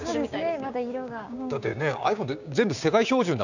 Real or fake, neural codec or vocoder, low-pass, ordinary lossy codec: fake; codec, 16 kHz, 6 kbps, DAC; 7.2 kHz; none